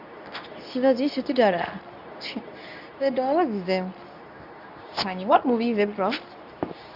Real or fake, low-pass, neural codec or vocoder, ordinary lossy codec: fake; 5.4 kHz; codec, 24 kHz, 0.9 kbps, WavTokenizer, medium speech release version 2; AAC, 48 kbps